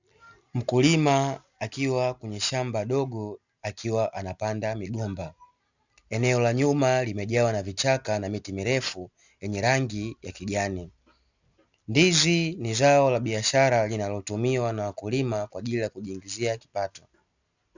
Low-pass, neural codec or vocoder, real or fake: 7.2 kHz; none; real